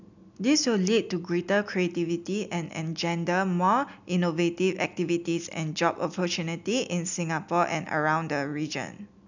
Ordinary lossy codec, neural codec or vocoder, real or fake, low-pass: none; none; real; 7.2 kHz